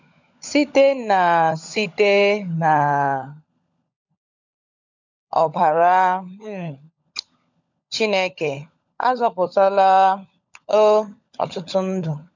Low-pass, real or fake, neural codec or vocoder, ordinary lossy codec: 7.2 kHz; fake; codec, 16 kHz, 16 kbps, FunCodec, trained on LibriTTS, 50 frames a second; none